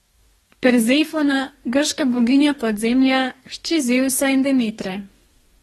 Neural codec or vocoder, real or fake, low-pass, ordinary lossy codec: codec, 44.1 kHz, 2.6 kbps, DAC; fake; 19.8 kHz; AAC, 32 kbps